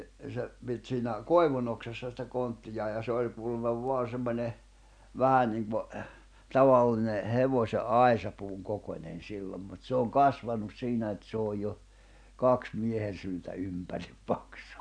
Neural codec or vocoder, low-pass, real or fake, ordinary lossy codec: none; 9.9 kHz; real; none